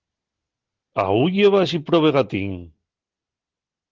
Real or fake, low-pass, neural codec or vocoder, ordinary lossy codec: real; 7.2 kHz; none; Opus, 16 kbps